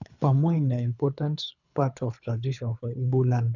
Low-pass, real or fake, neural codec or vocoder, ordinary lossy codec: 7.2 kHz; fake; codec, 24 kHz, 3 kbps, HILCodec; none